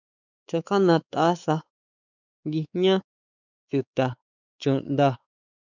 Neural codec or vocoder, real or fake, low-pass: codec, 16 kHz, 4 kbps, X-Codec, WavLM features, trained on Multilingual LibriSpeech; fake; 7.2 kHz